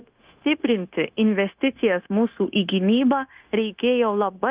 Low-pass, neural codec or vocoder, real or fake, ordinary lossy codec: 3.6 kHz; codec, 16 kHz, 0.9 kbps, LongCat-Audio-Codec; fake; Opus, 32 kbps